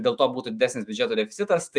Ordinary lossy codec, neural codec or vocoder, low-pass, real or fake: Opus, 64 kbps; autoencoder, 48 kHz, 128 numbers a frame, DAC-VAE, trained on Japanese speech; 9.9 kHz; fake